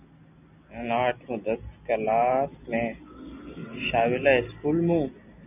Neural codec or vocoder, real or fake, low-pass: none; real; 3.6 kHz